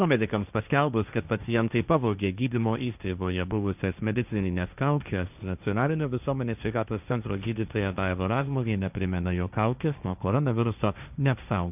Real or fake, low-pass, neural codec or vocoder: fake; 3.6 kHz; codec, 16 kHz, 1.1 kbps, Voila-Tokenizer